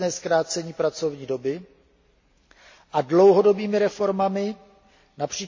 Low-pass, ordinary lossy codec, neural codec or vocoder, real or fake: 7.2 kHz; MP3, 32 kbps; none; real